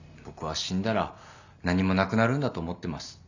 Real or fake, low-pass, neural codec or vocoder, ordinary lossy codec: real; 7.2 kHz; none; none